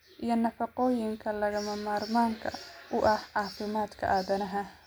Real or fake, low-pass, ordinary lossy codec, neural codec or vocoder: real; none; none; none